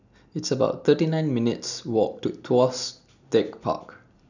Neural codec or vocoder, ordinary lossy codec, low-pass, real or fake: none; none; 7.2 kHz; real